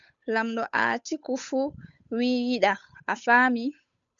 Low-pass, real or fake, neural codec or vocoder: 7.2 kHz; fake; codec, 16 kHz, 8 kbps, FunCodec, trained on Chinese and English, 25 frames a second